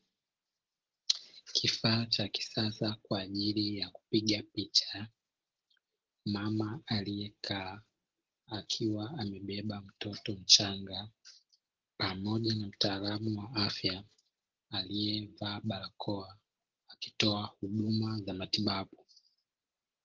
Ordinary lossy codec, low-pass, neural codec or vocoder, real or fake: Opus, 16 kbps; 7.2 kHz; none; real